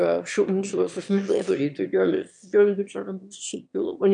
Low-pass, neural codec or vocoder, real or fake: 9.9 kHz; autoencoder, 22.05 kHz, a latent of 192 numbers a frame, VITS, trained on one speaker; fake